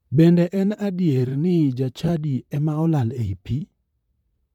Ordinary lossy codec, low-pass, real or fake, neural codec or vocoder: MP3, 96 kbps; 19.8 kHz; fake; vocoder, 44.1 kHz, 128 mel bands, Pupu-Vocoder